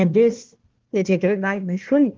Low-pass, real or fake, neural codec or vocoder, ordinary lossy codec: 7.2 kHz; fake; codec, 16 kHz, 1 kbps, FunCodec, trained on Chinese and English, 50 frames a second; Opus, 16 kbps